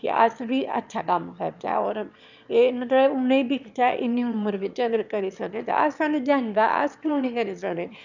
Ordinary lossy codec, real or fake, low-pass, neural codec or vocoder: none; fake; 7.2 kHz; autoencoder, 22.05 kHz, a latent of 192 numbers a frame, VITS, trained on one speaker